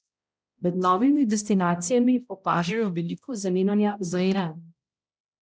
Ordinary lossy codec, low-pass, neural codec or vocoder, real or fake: none; none; codec, 16 kHz, 0.5 kbps, X-Codec, HuBERT features, trained on balanced general audio; fake